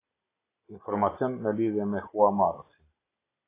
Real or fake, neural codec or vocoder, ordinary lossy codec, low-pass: real; none; AAC, 16 kbps; 3.6 kHz